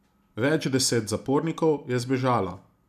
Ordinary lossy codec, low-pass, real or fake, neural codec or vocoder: none; 14.4 kHz; real; none